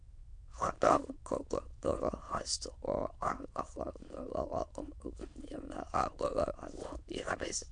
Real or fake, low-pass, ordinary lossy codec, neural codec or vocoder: fake; 9.9 kHz; MP3, 64 kbps; autoencoder, 22.05 kHz, a latent of 192 numbers a frame, VITS, trained on many speakers